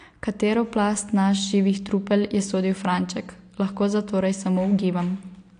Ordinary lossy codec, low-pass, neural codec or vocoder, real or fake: AAC, 48 kbps; 9.9 kHz; none; real